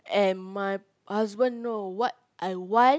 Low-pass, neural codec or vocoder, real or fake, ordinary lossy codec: none; none; real; none